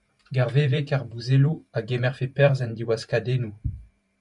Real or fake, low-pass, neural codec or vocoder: fake; 10.8 kHz; vocoder, 44.1 kHz, 128 mel bands every 512 samples, BigVGAN v2